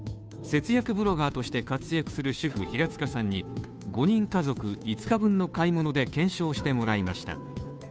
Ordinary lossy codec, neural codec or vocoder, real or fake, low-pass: none; codec, 16 kHz, 2 kbps, FunCodec, trained on Chinese and English, 25 frames a second; fake; none